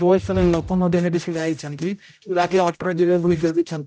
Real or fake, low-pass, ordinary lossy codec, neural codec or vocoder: fake; none; none; codec, 16 kHz, 0.5 kbps, X-Codec, HuBERT features, trained on general audio